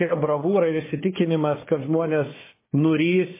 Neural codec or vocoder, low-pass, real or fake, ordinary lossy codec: codec, 16 kHz, 4 kbps, FunCodec, trained on Chinese and English, 50 frames a second; 3.6 kHz; fake; MP3, 16 kbps